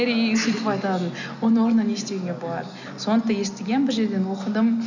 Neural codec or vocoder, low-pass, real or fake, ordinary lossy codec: none; 7.2 kHz; real; none